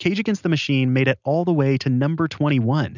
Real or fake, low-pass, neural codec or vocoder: real; 7.2 kHz; none